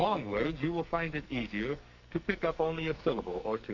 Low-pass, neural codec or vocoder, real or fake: 7.2 kHz; codec, 44.1 kHz, 2.6 kbps, SNAC; fake